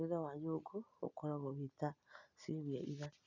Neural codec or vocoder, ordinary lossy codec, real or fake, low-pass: none; none; real; 7.2 kHz